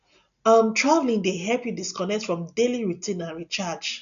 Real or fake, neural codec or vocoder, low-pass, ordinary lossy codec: real; none; 7.2 kHz; none